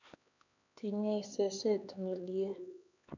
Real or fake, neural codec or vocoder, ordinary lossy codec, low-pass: fake; codec, 16 kHz, 2 kbps, X-Codec, HuBERT features, trained on LibriSpeech; none; 7.2 kHz